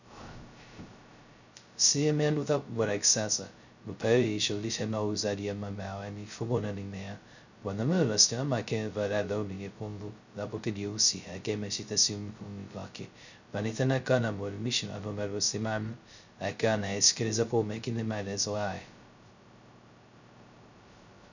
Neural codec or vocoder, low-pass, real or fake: codec, 16 kHz, 0.2 kbps, FocalCodec; 7.2 kHz; fake